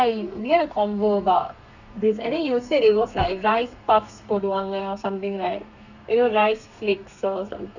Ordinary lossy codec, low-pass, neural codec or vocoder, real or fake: none; 7.2 kHz; codec, 32 kHz, 1.9 kbps, SNAC; fake